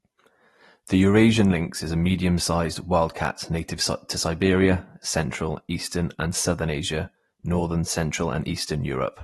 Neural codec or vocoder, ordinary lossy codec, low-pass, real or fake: none; AAC, 32 kbps; 19.8 kHz; real